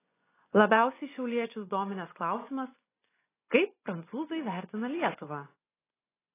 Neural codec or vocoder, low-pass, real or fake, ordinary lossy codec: vocoder, 24 kHz, 100 mel bands, Vocos; 3.6 kHz; fake; AAC, 16 kbps